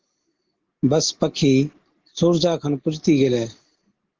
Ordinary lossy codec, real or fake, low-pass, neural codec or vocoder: Opus, 16 kbps; real; 7.2 kHz; none